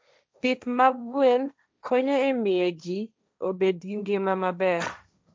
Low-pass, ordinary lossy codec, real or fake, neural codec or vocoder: none; none; fake; codec, 16 kHz, 1.1 kbps, Voila-Tokenizer